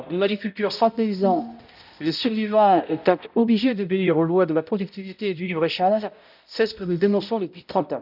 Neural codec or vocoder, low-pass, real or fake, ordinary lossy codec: codec, 16 kHz, 0.5 kbps, X-Codec, HuBERT features, trained on balanced general audio; 5.4 kHz; fake; none